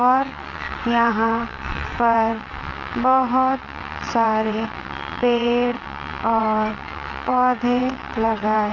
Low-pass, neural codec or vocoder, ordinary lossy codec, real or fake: 7.2 kHz; vocoder, 22.05 kHz, 80 mel bands, WaveNeXt; none; fake